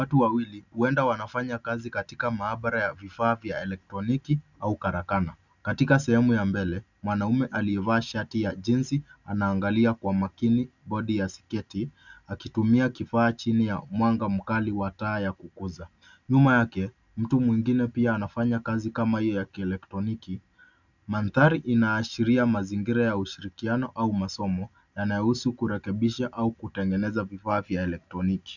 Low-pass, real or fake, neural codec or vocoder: 7.2 kHz; real; none